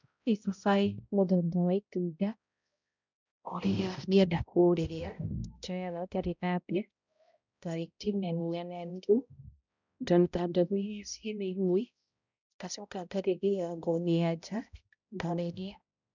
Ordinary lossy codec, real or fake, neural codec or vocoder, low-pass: none; fake; codec, 16 kHz, 0.5 kbps, X-Codec, HuBERT features, trained on balanced general audio; 7.2 kHz